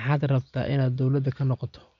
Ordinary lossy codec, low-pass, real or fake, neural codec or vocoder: none; 7.2 kHz; real; none